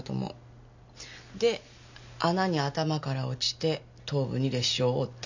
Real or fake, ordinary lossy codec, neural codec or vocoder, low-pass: real; none; none; 7.2 kHz